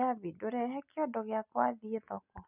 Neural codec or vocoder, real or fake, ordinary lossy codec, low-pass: none; real; none; 3.6 kHz